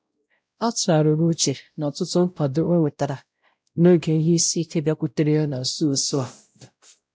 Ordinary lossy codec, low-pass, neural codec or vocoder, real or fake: none; none; codec, 16 kHz, 0.5 kbps, X-Codec, WavLM features, trained on Multilingual LibriSpeech; fake